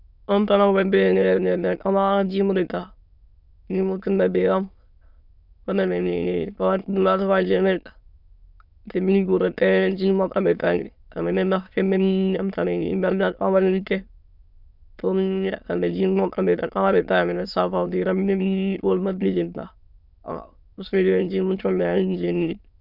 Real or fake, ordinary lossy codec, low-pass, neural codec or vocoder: fake; none; 5.4 kHz; autoencoder, 22.05 kHz, a latent of 192 numbers a frame, VITS, trained on many speakers